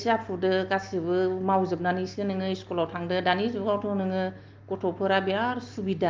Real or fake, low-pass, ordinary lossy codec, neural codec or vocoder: real; 7.2 kHz; Opus, 32 kbps; none